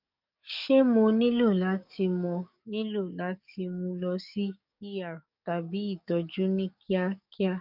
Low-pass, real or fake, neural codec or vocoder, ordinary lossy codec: 5.4 kHz; fake; codec, 44.1 kHz, 7.8 kbps, DAC; none